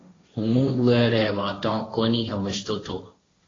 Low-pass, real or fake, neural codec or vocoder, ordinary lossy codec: 7.2 kHz; fake; codec, 16 kHz, 1.1 kbps, Voila-Tokenizer; AAC, 32 kbps